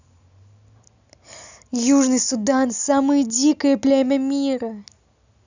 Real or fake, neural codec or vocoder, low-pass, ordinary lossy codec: real; none; 7.2 kHz; none